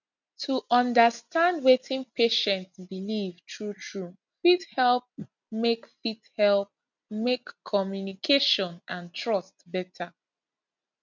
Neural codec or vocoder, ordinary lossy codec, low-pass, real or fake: none; none; 7.2 kHz; real